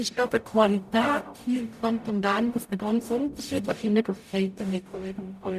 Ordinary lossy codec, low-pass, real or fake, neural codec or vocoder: none; 14.4 kHz; fake; codec, 44.1 kHz, 0.9 kbps, DAC